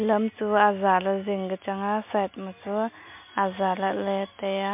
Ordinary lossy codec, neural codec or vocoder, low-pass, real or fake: MP3, 32 kbps; none; 3.6 kHz; real